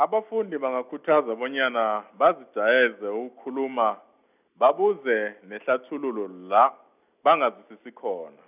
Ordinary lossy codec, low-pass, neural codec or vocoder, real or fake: none; 3.6 kHz; none; real